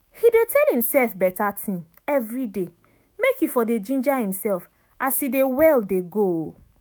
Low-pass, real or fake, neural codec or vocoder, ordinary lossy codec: none; fake; autoencoder, 48 kHz, 128 numbers a frame, DAC-VAE, trained on Japanese speech; none